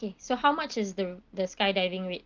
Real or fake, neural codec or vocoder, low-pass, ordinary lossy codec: real; none; 7.2 kHz; Opus, 32 kbps